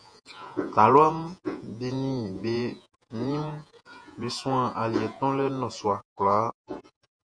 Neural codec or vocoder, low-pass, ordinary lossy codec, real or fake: vocoder, 48 kHz, 128 mel bands, Vocos; 9.9 kHz; MP3, 64 kbps; fake